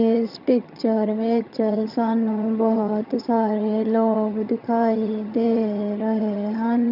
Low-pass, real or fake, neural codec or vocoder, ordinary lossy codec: 5.4 kHz; fake; vocoder, 22.05 kHz, 80 mel bands, HiFi-GAN; none